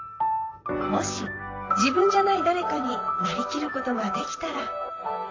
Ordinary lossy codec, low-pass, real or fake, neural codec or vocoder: AAC, 32 kbps; 7.2 kHz; fake; vocoder, 44.1 kHz, 128 mel bands, Pupu-Vocoder